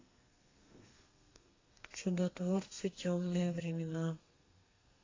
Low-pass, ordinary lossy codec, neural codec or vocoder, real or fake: 7.2 kHz; AAC, 32 kbps; codec, 32 kHz, 1.9 kbps, SNAC; fake